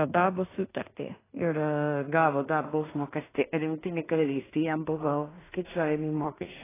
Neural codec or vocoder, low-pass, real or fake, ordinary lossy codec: codec, 16 kHz in and 24 kHz out, 0.4 kbps, LongCat-Audio-Codec, two codebook decoder; 3.6 kHz; fake; AAC, 16 kbps